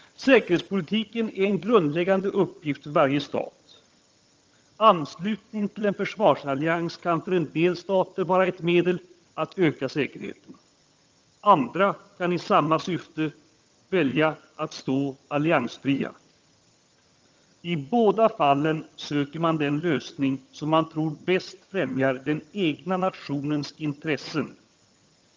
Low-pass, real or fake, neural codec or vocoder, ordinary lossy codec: 7.2 kHz; fake; vocoder, 22.05 kHz, 80 mel bands, HiFi-GAN; Opus, 24 kbps